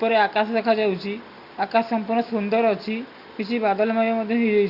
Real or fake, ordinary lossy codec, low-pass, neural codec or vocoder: real; Opus, 64 kbps; 5.4 kHz; none